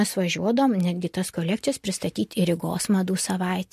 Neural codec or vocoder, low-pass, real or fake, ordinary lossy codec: none; 14.4 kHz; real; MP3, 64 kbps